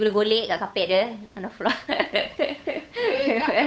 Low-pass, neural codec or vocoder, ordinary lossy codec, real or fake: none; codec, 16 kHz, 8 kbps, FunCodec, trained on Chinese and English, 25 frames a second; none; fake